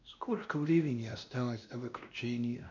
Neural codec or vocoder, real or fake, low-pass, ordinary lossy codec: codec, 16 kHz, 1 kbps, X-Codec, WavLM features, trained on Multilingual LibriSpeech; fake; 7.2 kHz; none